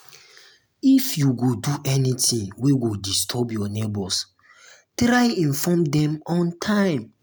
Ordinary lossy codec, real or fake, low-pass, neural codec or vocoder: none; real; none; none